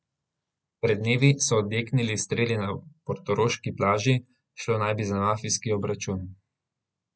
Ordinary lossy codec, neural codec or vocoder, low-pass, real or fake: none; none; none; real